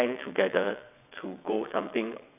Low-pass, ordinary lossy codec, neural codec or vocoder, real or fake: 3.6 kHz; none; vocoder, 22.05 kHz, 80 mel bands, WaveNeXt; fake